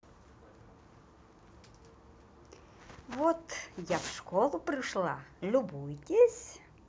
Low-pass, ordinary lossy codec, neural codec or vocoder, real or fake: none; none; none; real